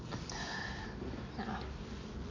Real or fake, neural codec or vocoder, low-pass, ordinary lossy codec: real; none; 7.2 kHz; AAC, 32 kbps